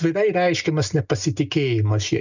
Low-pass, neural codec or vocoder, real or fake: 7.2 kHz; none; real